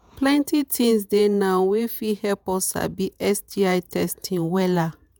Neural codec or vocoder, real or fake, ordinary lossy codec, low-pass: vocoder, 48 kHz, 128 mel bands, Vocos; fake; none; none